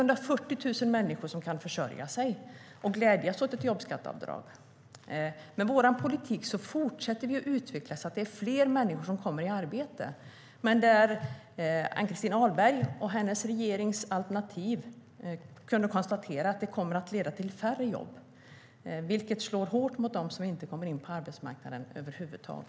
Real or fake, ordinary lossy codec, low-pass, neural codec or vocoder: real; none; none; none